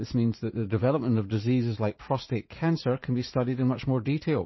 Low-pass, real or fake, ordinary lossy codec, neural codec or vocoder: 7.2 kHz; fake; MP3, 24 kbps; vocoder, 44.1 kHz, 80 mel bands, Vocos